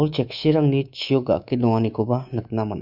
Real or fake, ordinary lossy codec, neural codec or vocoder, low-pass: fake; none; codec, 24 kHz, 3.1 kbps, DualCodec; 5.4 kHz